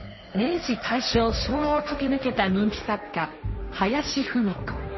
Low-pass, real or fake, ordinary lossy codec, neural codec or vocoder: 7.2 kHz; fake; MP3, 24 kbps; codec, 16 kHz, 1.1 kbps, Voila-Tokenizer